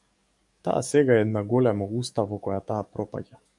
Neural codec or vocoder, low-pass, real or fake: codec, 44.1 kHz, 7.8 kbps, DAC; 10.8 kHz; fake